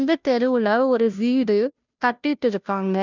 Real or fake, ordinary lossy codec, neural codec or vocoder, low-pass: fake; none; codec, 16 kHz, 0.5 kbps, FunCodec, trained on Chinese and English, 25 frames a second; 7.2 kHz